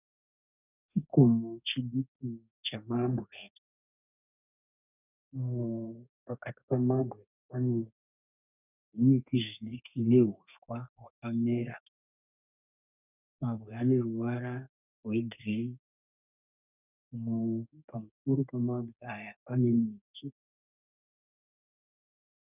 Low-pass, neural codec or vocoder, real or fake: 3.6 kHz; codec, 32 kHz, 1.9 kbps, SNAC; fake